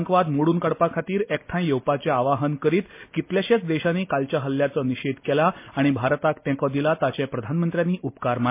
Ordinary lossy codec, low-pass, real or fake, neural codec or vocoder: MP3, 24 kbps; 3.6 kHz; real; none